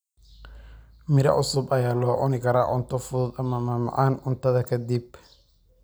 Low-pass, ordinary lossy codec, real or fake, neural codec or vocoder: none; none; real; none